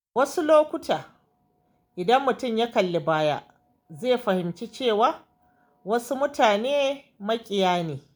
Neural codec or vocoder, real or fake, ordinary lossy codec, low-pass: none; real; none; none